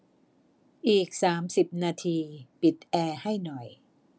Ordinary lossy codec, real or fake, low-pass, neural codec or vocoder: none; real; none; none